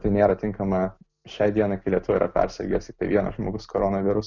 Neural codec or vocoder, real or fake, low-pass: none; real; 7.2 kHz